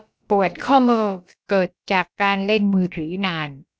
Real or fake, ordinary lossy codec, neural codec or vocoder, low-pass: fake; none; codec, 16 kHz, about 1 kbps, DyCAST, with the encoder's durations; none